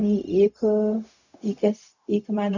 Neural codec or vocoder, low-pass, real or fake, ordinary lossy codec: codec, 16 kHz, 0.4 kbps, LongCat-Audio-Codec; 7.2 kHz; fake; none